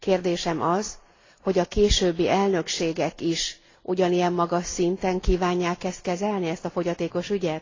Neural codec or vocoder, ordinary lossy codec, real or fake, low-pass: none; AAC, 32 kbps; real; 7.2 kHz